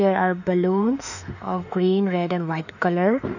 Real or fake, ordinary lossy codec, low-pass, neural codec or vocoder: fake; none; 7.2 kHz; autoencoder, 48 kHz, 32 numbers a frame, DAC-VAE, trained on Japanese speech